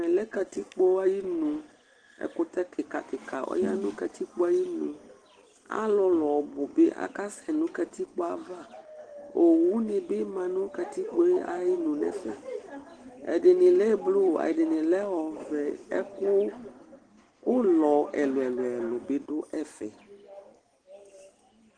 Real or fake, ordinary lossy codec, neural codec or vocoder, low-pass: real; Opus, 16 kbps; none; 9.9 kHz